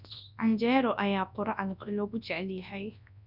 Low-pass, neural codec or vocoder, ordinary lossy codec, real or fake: 5.4 kHz; codec, 24 kHz, 0.9 kbps, WavTokenizer, large speech release; none; fake